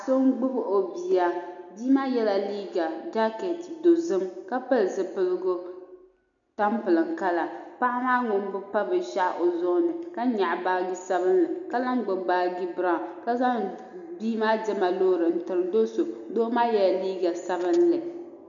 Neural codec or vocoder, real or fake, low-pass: none; real; 7.2 kHz